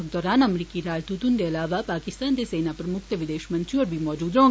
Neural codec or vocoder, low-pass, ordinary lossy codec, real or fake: none; none; none; real